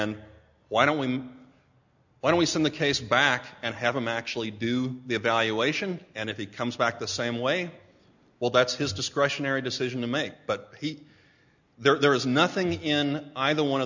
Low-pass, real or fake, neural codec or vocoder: 7.2 kHz; real; none